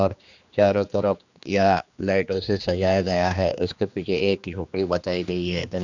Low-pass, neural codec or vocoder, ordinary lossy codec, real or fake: 7.2 kHz; codec, 16 kHz, 2 kbps, X-Codec, HuBERT features, trained on general audio; none; fake